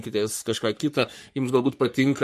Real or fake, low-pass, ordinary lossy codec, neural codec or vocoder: fake; 14.4 kHz; MP3, 64 kbps; codec, 44.1 kHz, 3.4 kbps, Pupu-Codec